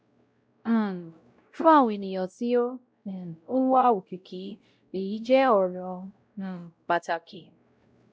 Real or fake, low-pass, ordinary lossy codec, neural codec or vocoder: fake; none; none; codec, 16 kHz, 0.5 kbps, X-Codec, WavLM features, trained on Multilingual LibriSpeech